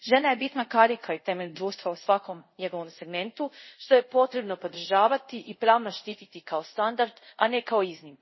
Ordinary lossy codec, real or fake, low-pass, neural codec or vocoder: MP3, 24 kbps; fake; 7.2 kHz; codec, 24 kHz, 0.5 kbps, DualCodec